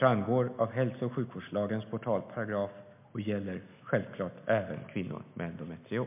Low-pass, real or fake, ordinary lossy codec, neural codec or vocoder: 3.6 kHz; real; MP3, 32 kbps; none